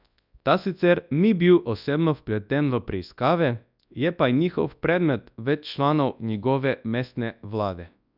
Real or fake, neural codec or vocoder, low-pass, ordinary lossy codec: fake; codec, 24 kHz, 0.9 kbps, WavTokenizer, large speech release; 5.4 kHz; none